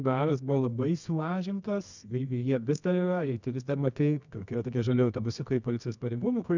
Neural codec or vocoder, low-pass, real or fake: codec, 24 kHz, 0.9 kbps, WavTokenizer, medium music audio release; 7.2 kHz; fake